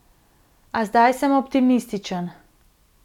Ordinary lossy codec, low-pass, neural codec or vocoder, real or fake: none; 19.8 kHz; none; real